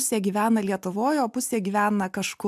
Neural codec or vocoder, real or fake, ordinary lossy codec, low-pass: none; real; AAC, 96 kbps; 14.4 kHz